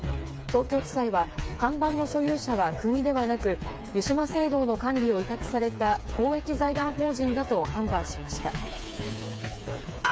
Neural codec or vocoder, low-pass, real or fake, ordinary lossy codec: codec, 16 kHz, 4 kbps, FreqCodec, smaller model; none; fake; none